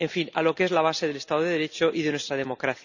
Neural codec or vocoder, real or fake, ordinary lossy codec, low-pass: none; real; none; 7.2 kHz